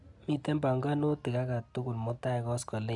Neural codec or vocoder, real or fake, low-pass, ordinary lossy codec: none; real; 10.8 kHz; AAC, 64 kbps